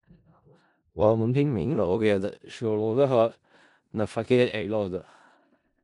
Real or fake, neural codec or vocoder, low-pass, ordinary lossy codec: fake; codec, 16 kHz in and 24 kHz out, 0.4 kbps, LongCat-Audio-Codec, four codebook decoder; 10.8 kHz; none